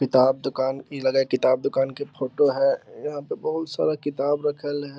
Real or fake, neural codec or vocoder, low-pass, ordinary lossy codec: real; none; none; none